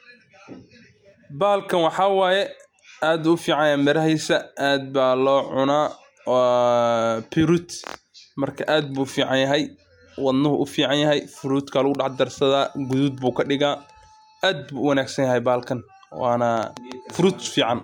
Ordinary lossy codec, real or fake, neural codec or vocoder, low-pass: MP3, 96 kbps; real; none; 19.8 kHz